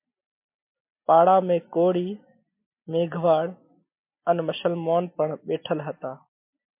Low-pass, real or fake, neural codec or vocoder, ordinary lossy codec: 3.6 kHz; real; none; MP3, 32 kbps